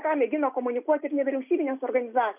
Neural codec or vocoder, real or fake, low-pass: none; real; 3.6 kHz